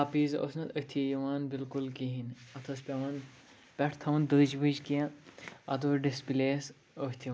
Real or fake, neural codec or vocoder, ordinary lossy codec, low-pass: real; none; none; none